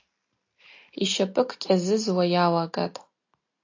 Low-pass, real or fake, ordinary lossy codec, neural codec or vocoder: 7.2 kHz; real; AAC, 48 kbps; none